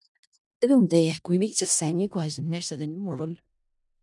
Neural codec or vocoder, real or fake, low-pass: codec, 16 kHz in and 24 kHz out, 0.4 kbps, LongCat-Audio-Codec, four codebook decoder; fake; 10.8 kHz